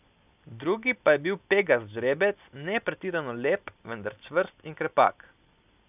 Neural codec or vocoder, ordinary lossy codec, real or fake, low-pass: none; none; real; 3.6 kHz